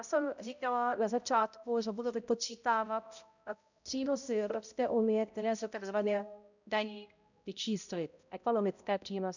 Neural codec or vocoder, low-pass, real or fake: codec, 16 kHz, 0.5 kbps, X-Codec, HuBERT features, trained on balanced general audio; 7.2 kHz; fake